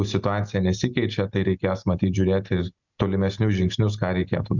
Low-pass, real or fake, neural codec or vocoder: 7.2 kHz; real; none